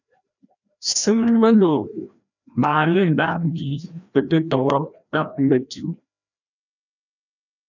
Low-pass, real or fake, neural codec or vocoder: 7.2 kHz; fake; codec, 16 kHz, 1 kbps, FreqCodec, larger model